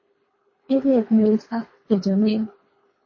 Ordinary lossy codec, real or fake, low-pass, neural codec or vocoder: MP3, 32 kbps; fake; 7.2 kHz; codec, 24 kHz, 3 kbps, HILCodec